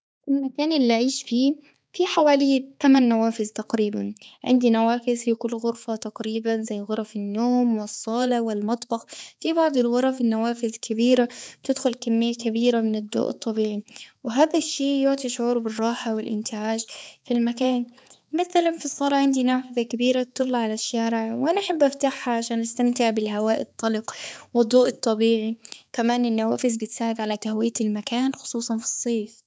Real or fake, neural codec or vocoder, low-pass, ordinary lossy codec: fake; codec, 16 kHz, 4 kbps, X-Codec, HuBERT features, trained on balanced general audio; none; none